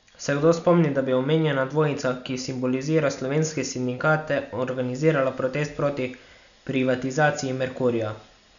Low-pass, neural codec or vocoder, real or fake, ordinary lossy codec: 7.2 kHz; none; real; none